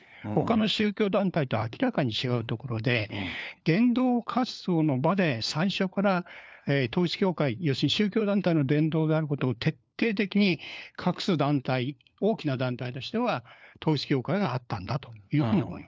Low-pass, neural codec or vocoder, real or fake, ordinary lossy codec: none; codec, 16 kHz, 4 kbps, FunCodec, trained on LibriTTS, 50 frames a second; fake; none